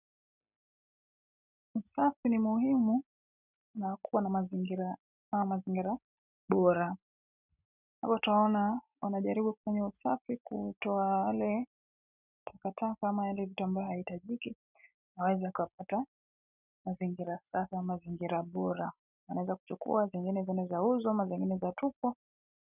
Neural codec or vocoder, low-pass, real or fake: none; 3.6 kHz; real